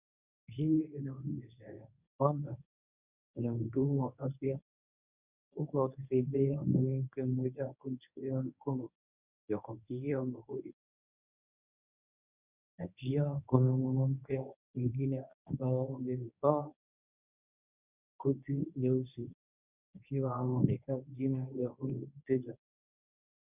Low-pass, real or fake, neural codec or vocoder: 3.6 kHz; fake; codec, 24 kHz, 0.9 kbps, WavTokenizer, medium speech release version 1